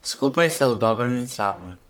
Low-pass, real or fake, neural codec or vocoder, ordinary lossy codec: none; fake; codec, 44.1 kHz, 1.7 kbps, Pupu-Codec; none